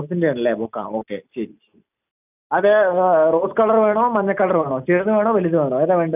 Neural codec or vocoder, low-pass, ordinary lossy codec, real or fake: none; 3.6 kHz; none; real